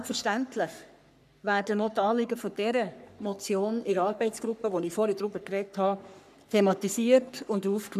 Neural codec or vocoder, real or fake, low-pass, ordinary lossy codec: codec, 44.1 kHz, 3.4 kbps, Pupu-Codec; fake; 14.4 kHz; none